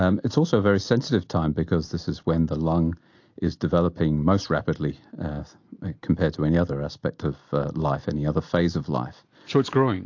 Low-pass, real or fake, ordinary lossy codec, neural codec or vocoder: 7.2 kHz; real; AAC, 48 kbps; none